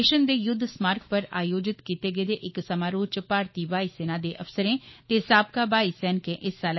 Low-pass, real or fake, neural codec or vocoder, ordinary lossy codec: 7.2 kHz; fake; autoencoder, 48 kHz, 128 numbers a frame, DAC-VAE, trained on Japanese speech; MP3, 24 kbps